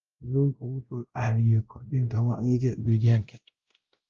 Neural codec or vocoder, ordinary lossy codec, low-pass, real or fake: codec, 16 kHz, 0.5 kbps, X-Codec, WavLM features, trained on Multilingual LibriSpeech; Opus, 32 kbps; 7.2 kHz; fake